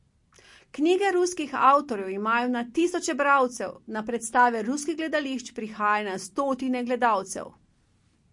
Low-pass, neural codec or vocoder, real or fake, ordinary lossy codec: 10.8 kHz; none; real; MP3, 48 kbps